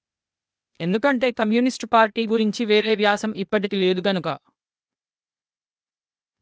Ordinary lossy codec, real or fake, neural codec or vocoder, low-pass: none; fake; codec, 16 kHz, 0.8 kbps, ZipCodec; none